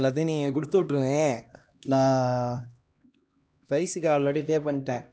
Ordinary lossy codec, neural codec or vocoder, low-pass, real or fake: none; codec, 16 kHz, 1 kbps, X-Codec, HuBERT features, trained on LibriSpeech; none; fake